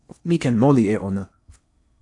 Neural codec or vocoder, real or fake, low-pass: codec, 16 kHz in and 24 kHz out, 0.8 kbps, FocalCodec, streaming, 65536 codes; fake; 10.8 kHz